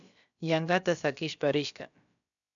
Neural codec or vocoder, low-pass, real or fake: codec, 16 kHz, about 1 kbps, DyCAST, with the encoder's durations; 7.2 kHz; fake